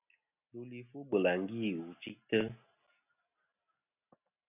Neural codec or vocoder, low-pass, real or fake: none; 3.6 kHz; real